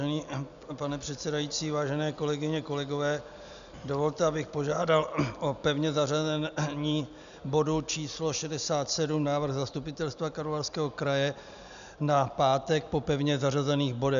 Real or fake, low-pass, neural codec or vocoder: real; 7.2 kHz; none